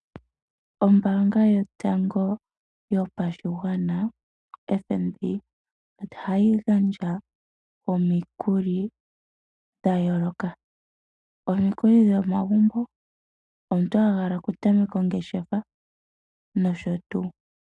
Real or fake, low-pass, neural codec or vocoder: real; 10.8 kHz; none